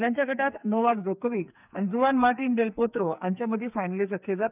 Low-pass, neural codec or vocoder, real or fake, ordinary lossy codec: 3.6 kHz; codec, 44.1 kHz, 2.6 kbps, SNAC; fake; none